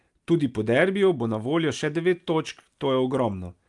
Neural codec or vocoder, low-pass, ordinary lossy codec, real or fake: none; 10.8 kHz; Opus, 32 kbps; real